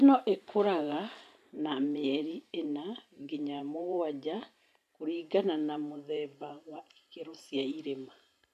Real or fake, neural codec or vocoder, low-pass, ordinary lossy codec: fake; vocoder, 44.1 kHz, 128 mel bands every 512 samples, BigVGAN v2; 14.4 kHz; none